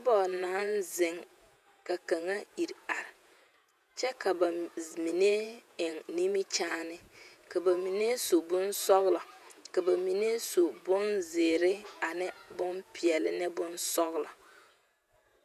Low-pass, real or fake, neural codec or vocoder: 14.4 kHz; fake; vocoder, 48 kHz, 128 mel bands, Vocos